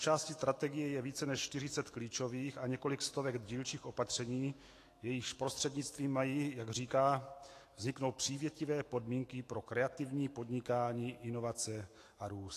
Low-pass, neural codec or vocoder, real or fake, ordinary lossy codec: 14.4 kHz; autoencoder, 48 kHz, 128 numbers a frame, DAC-VAE, trained on Japanese speech; fake; AAC, 48 kbps